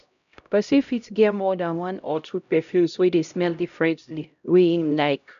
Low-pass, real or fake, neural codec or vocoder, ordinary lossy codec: 7.2 kHz; fake; codec, 16 kHz, 0.5 kbps, X-Codec, HuBERT features, trained on LibriSpeech; none